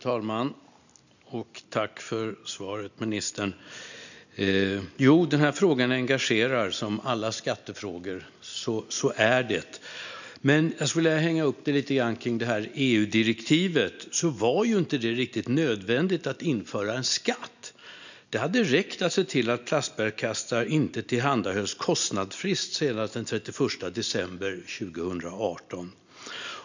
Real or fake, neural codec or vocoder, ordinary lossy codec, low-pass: real; none; none; 7.2 kHz